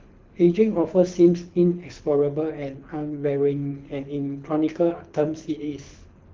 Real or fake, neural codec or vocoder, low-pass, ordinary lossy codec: fake; codec, 24 kHz, 6 kbps, HILCodec; 7.2 kHz; Opus, 24 kbps